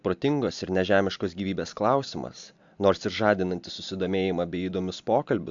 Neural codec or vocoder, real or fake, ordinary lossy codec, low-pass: none; real; AAC, 64 kbps; 7.2 kHz